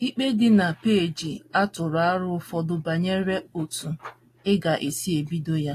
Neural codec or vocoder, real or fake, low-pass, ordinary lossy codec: none; real; 14.4 kHz; AAC, 48 kbps